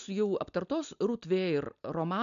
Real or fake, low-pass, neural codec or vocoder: real; 7.2 kHz; none